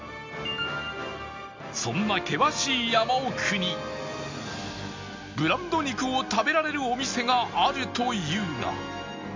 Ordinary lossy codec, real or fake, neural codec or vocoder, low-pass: MP3, 64 kbps; real; none; 7.2 kHz